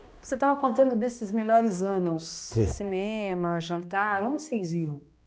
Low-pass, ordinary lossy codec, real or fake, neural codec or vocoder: none; none; fake; codec, 16 kHz, 1 kbps, X-Codec, HuBERT features, trained on balanced general audio